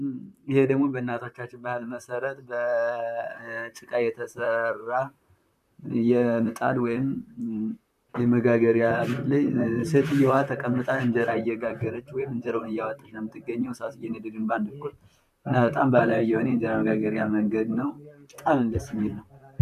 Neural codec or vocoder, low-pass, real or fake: vocoder, 44.1 kHz, 128 mel bands, Pupu-Vocoder; 14.4 kHz; fake